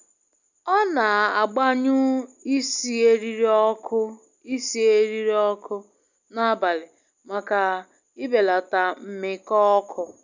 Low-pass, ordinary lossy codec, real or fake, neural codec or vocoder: 7.2 kHz; none; real; none